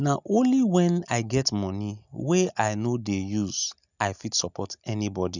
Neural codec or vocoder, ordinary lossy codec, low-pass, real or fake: none; none; 7.2 kHz; real